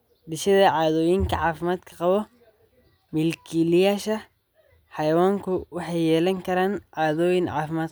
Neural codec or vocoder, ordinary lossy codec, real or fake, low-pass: none; none; real; none